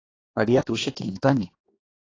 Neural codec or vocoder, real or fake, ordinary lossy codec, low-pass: codec, 16 kHz, 2 kbps, X-Codec, HuBERT features, trained on balanced general audio; fake; AAC, 32 kbps; 7.2 kHz